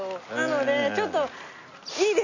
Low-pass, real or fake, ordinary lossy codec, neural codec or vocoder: 7.2 kHz; real; none; none